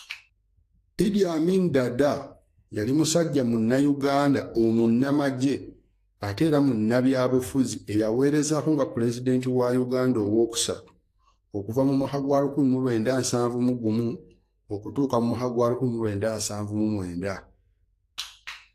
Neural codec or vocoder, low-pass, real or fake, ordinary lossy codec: codec, 44.1 kHz, 2.6 kbps, SNAC; 14.4 kHz; fake; AAC, 64 kbps